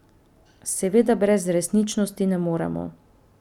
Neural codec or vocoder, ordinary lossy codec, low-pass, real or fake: none; none; 19.8 kHz; real